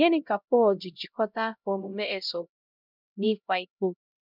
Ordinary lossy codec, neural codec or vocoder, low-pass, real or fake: none; codec, 16 kHz, 0.5 kbps, X-Codec, HuBERT features, trained on LibriSpeech; 5.4 kHz; fake